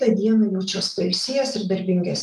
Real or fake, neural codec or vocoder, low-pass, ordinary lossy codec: real; none; 14.4 kHz; Opus, 32 kbps